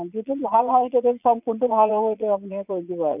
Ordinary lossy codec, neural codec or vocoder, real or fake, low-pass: none; vocoder, 22.05 kHz, 80 mel bands, Vocos; fake; 3.6 kHz